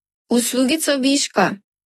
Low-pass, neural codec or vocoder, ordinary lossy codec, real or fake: 19.8 kHz; autoencoder, 48 kHz, 32 numbers a frame, DAC-VAE, trained on Japanese speech; AAC, 32 kbps; fake